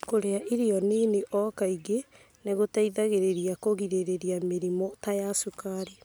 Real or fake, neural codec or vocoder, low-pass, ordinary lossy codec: real; none; none; none